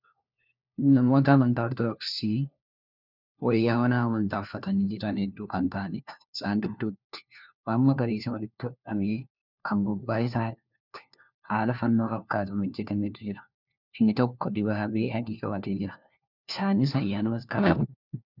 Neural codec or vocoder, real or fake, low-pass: codec, 16 kHz, 1 kbps, FunCodec, trained on LibriTTS, 50 frames a second; fake; 5.4 kHz